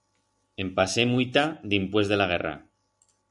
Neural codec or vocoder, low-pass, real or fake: none; 10.8 kHz; real